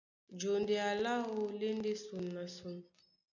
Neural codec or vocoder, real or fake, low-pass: none; real; 7.2 kHz